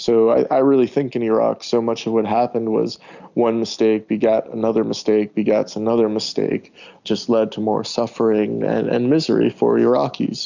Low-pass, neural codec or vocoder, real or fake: 7.2 kHz; none; real